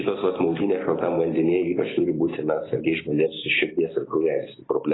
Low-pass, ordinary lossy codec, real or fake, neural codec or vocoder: 7.2 kHz; AAC, 16 kbps; real; none